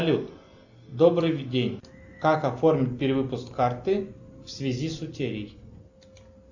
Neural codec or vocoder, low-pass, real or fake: none; 7.2 kHz; real